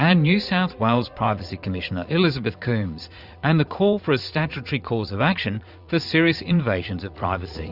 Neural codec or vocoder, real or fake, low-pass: vocoder, 44.1 kHz, 80 mel bands, Vocos; fake; 5.4 kHz